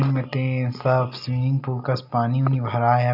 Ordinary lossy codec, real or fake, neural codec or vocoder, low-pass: none; real; none; 5.4 kHz